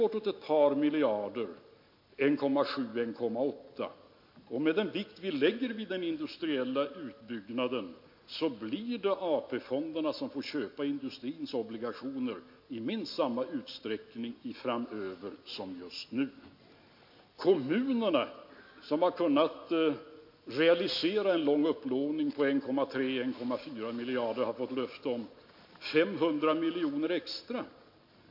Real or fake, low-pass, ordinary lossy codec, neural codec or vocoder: real; 5.4 kHz; MP3, 32 kbps; none